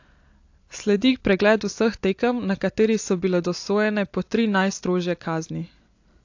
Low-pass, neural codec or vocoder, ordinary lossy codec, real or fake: 7.2 kHz; none; AAC, 48 kbps; real